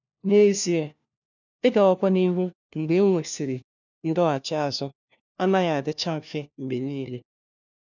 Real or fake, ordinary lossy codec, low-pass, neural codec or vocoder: fake; none; 7.2 kHz; codec, 16 kHz, 1 kbps, FunCodec, trained on LibriTTS, 50 frames a second